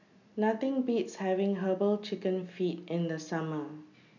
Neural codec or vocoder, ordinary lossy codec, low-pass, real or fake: none; none; 7.2 kHz; real